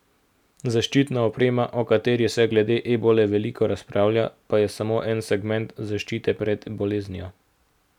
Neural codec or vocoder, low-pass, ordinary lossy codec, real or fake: none; 19.8 kHz; none; real